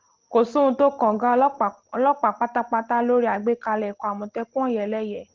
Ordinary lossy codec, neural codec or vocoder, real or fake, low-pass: Opus, 16 kbps; none; real; 7.2 kHz